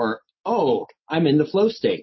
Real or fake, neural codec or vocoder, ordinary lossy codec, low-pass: real; none; MP3, 24 kbps; 7.2 kHz